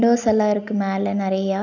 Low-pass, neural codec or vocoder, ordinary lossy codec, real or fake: 7.2 kHz; none; none; real